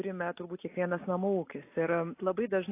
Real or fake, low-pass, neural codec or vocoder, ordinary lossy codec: real; 3.6 kHz; none; AAC, 24 kbps